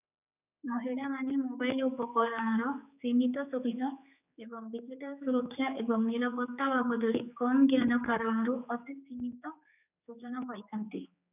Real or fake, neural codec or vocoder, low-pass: fake; codec, 16 kHz, 4 kbps, X-Codec, HuBERT features, trained on general audio; 3.6 kHz